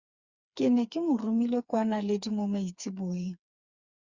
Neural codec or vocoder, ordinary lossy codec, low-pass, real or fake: codec, 16 kHz, 4 kbps, FreqCodec, smaller model; Opus, 64 kbps; 7.2 kHz; fake